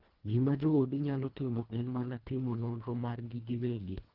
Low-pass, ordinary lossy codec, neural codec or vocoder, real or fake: 5.4 kHz; Opus, 16 kbps; codec, 24 kHz, 1.5 kbps, HILCodec; fake